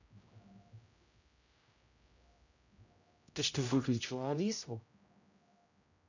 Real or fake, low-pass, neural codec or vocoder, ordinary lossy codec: fake; 7.2 kHz; codec, 16 kHz, 0.5 kbps, X-Codec, HuBERT features, trained on general audio; none